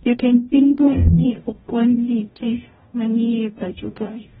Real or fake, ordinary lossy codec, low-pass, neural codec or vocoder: fake; AAC, 16 kbps; 19.8 kHz; codec, 44.1 kHz, 0.9 kbps, DAC